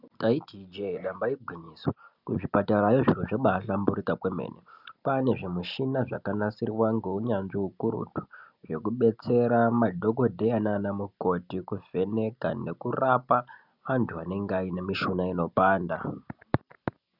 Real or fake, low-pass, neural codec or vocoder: real; 5.4 kHz; none